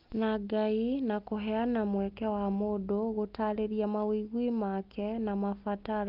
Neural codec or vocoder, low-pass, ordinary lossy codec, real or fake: none; 5.4 kHz; Opus, 24 kbps; real